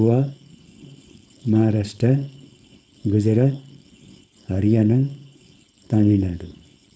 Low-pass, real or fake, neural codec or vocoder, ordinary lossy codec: none; fake; codec, 16 kHz, 4.8 kbps, FACodec; none